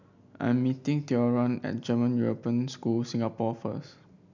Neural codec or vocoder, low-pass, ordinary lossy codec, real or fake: none; 7.2 kHz; none; real